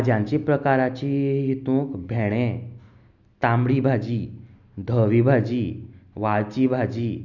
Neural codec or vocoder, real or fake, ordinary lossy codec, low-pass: none; real; none; 7.2 kHz